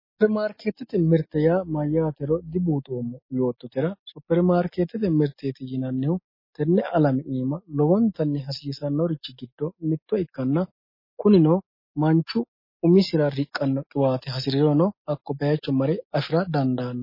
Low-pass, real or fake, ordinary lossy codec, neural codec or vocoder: 5.4 kHz; real; MP3, 24 kbps; none